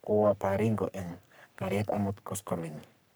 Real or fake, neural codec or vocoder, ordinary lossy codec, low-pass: fake; codec, 44.1 kHz, 3.4 kbps, Pupu-Codec; none; none